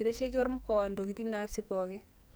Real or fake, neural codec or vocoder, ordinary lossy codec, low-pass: fake; codec, 44.1 kHz, 2.6 kbps, SNAC; none; none